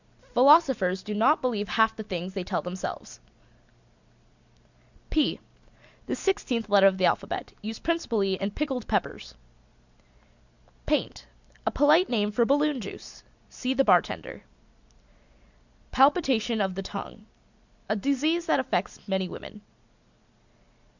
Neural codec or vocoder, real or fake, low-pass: none; real; 7.2 kHz